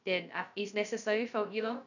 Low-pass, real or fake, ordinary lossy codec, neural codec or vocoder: 7.2 kHz; fake; none; codec, 16 kHz, 0.2 kbps, FocalCodec